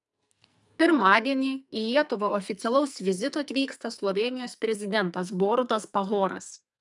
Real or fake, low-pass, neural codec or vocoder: fake; 10.8 kHz; codec, 44.1 kHz, 2.6 kbps, SNAC